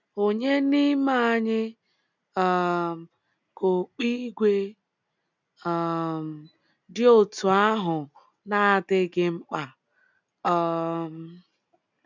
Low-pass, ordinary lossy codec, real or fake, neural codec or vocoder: 7.2 kHz; none; real; none